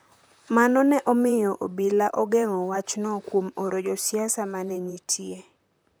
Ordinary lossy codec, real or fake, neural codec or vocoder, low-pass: none; fake; vocoder, 44.1 kHz, 128 mel bands, Pupu-Vocoder; none